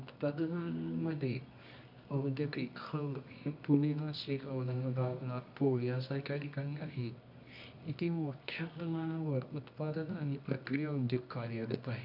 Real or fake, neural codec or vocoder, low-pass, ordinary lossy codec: fake; codec, 24 kHz, 0.9 kbps, WavTokenizer, medium music audio release; 5.4 kHz; none